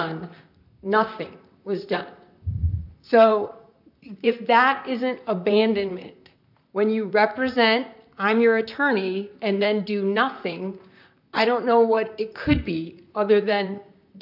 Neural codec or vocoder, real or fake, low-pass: vocoder, 44.1 kHz, 128 mel bands, Pupu-Vocoder; fake; 5.4 kHz